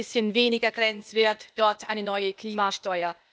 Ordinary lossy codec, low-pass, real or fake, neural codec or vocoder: none; none; fake; codec, 16 kHz, 0.8 kbps, ZipCodec